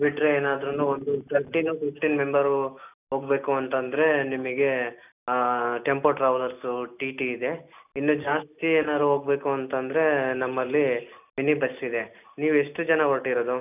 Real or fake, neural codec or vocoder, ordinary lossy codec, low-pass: real; none; none; 3.6 kHz